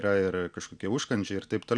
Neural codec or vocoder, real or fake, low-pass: none; real; 9.9 kHz